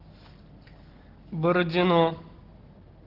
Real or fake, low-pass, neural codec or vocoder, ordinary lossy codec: real; 5.4 kHz; none; Opus, 16 kbps